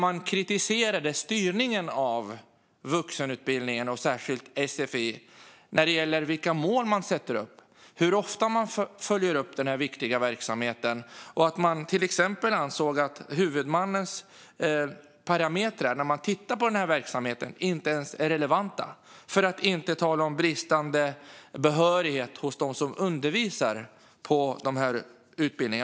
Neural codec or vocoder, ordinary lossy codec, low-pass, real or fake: none; none; none; real